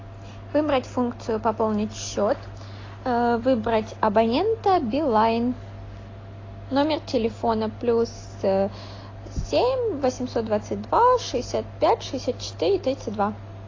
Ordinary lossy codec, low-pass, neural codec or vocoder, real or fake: AAC, 32 kbps; 7.2 kHz; none; real